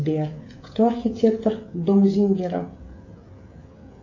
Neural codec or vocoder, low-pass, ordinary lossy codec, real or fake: codec, 16 kHz, 16 kbps, FreqCodec, smaller model; 7.2 kHz; AAC, 48 kbps; fake